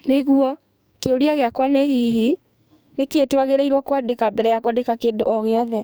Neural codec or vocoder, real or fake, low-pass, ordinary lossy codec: codec, 44.1 kHz, 2.6 kbps, SNAC; fake; none; none